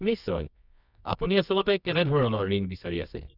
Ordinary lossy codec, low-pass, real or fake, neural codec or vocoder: none; 5.4 kHz; fake; codec, 24 kHz, 0.9 kbps, WavTokenizer, medium music audio release